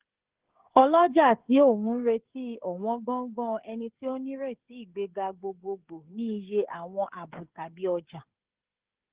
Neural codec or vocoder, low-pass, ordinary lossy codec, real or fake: codec, 16 kHz, 16 kbps, FreqCodec, smaller model; 3.6 kHz; Opus, 16 kbps; fake